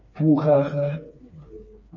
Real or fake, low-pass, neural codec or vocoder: fake; 7.2 kHz; codec, 16 kHz, 4 kbps, FreqCodec, smaller model